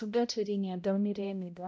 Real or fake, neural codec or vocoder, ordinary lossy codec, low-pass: fake; codec, 16 kHz, 0.5 kbps, X-Codec, HuBERT features, trained on balanced general audio; none; none